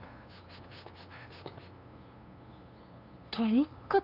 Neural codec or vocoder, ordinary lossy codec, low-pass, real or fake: codec, 16 kHz, 2 kbps, FunCodec, trained on LibriTTS, 25 frames a second; AAC, 48 kbps; 5.4 kHz; fake